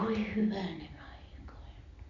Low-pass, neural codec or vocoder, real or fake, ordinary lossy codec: 7.2 kHz; none; real; none